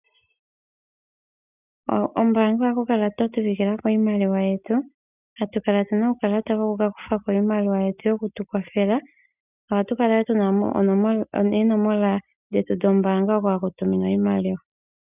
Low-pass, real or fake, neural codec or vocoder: 3.6 kHz; real; none